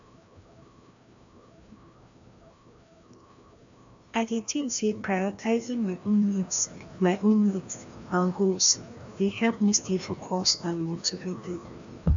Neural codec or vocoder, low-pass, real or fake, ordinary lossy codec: codec, 16 kHz, 1 kbps, FreqCodec, larger model; 7.2 kHz; fake; AAC, 64 kbps